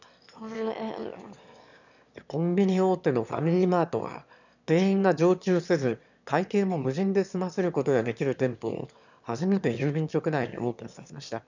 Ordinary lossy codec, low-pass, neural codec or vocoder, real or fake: none; 7.2 kHz; autoencoder, 22.05 kHz, a latent of 192 numbers a frame, VITS, trained on one speaker; fake